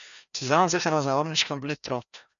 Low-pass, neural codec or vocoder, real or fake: 7.2 kHz; codec, 16 kHz, 1 kbps, FreqCodec, larger model; fake